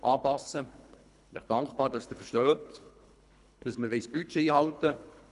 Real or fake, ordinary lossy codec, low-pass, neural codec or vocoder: fake; none; 10.8 kHz; codec, 24 kHz, 3 kbps, HILCodec